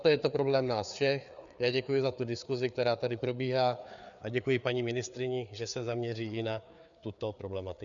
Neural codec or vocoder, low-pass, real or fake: codec, 16 kHz, 4 kbps, FreqCodec, larger model; 7.2 kHz; fake